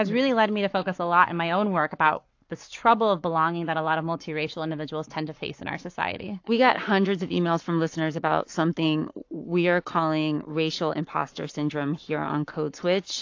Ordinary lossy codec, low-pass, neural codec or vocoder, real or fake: AAC, 48 kbps; 7.2 kHz; codec, 44.1 kHz, 7.8 kbps, Pupu-Codec; fake